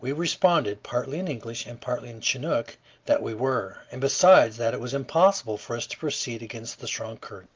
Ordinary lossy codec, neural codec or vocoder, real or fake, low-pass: Opus, 32 kbps; none; real; 7.2 kHz